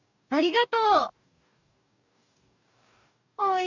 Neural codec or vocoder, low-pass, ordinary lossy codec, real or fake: codec, 44.1 kHz, 2.6 kbps, DAC; 7.2 kHz; none; fake